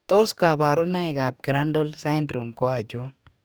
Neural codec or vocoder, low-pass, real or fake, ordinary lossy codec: codec, 44.1 kHz, 2.6 kbps, DAC; none; fake; none